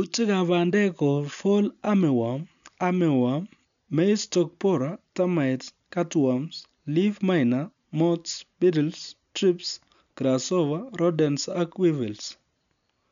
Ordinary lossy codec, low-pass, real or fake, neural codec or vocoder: none; 7.2 kHz; real; none